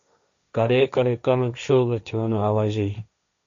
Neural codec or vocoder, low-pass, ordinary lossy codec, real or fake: codec, 16 kHz, 1.1 kbps, Voila-Tokenizer; 7.2 kHz; AAC, 64 kbps; fake